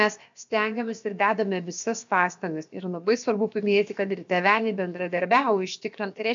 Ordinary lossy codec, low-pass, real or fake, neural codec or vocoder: MP3, 64 kbps; 7.2 kHz; fake; codec, 16 kHz, about 1 kbps, DyCAST, with the encoder's durations